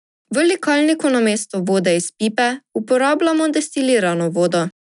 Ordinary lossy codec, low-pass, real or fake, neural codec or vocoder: none; 10.8 kHz; real; none